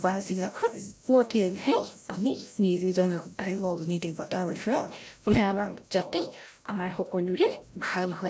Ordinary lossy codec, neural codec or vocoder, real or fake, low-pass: none; codec, 16 kHz, 0.5 kbps, FreqCodec, larger model; fake; none